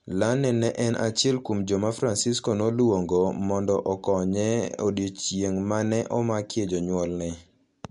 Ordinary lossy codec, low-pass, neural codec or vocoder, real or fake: MP3, 64 kbps; 10.8 kHz; none; real